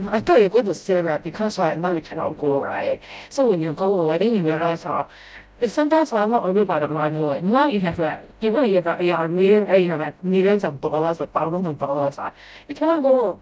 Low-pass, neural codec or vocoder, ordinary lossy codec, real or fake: none; codec, 16 kHz, 0.5 kbps, FreqCodec, smaller model; none; fake